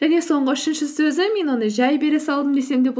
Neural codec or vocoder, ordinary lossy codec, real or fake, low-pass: none; none; real; none